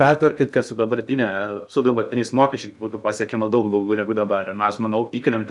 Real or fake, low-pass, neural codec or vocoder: fake; 10.8 kHz; codec, 16 kHz in and 24 kHz out, 0.6 kbps, FocalCodec, streaming, 2048 codes